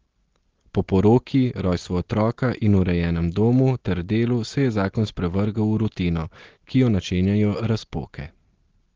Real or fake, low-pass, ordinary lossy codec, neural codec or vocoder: real; 7.2 kHz; Opus, 16 kbps; none